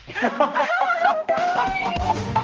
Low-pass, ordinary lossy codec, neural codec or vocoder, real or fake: 7.2 kHz; Opus, 24 kbps; codec, 16 kHz, 1 kbps, X-Codec, HuBERT features, trained on balanced general audio; fake